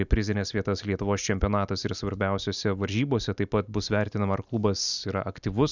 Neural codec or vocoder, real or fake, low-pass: none; real; 7.2 kHz